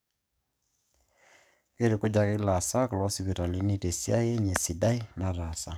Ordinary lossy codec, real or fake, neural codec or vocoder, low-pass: none; fake; codec, 44.1 kHz, 7.8 kbps, DAC; none